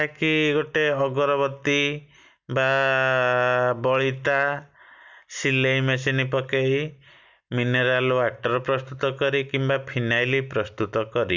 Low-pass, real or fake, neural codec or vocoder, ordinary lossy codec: 7.2 kHz; real; none; none